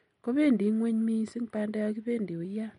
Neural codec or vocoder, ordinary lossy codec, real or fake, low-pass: none; MP3, 48 kbps; real; 19.8 kHz